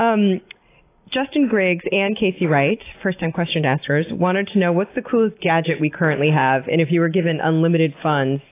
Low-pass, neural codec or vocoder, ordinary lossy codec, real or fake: 3.6 kHz; none; AAC, 24 kbps; real